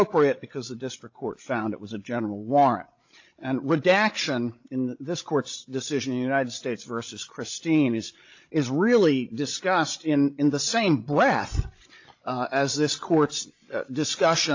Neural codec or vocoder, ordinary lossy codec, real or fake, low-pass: codec, 16 kHz, 16 kbps, FreqCodec, larger model; AAC, 48 kbps; fake; 7.2 kHz